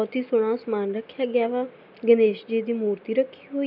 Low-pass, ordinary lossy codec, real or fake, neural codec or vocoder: 5.4 kHz; none; real; none